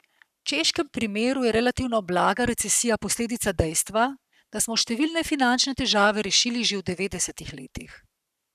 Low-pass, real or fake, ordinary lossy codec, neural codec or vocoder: 14.4 kHz; fake; none; codec, 44.1 kHz, 7.8 kbps, Pupu-Codec